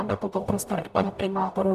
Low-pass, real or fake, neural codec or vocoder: 14.4 kHz; fake; codec, 44.1 kHz, 0.9 kbps, DAC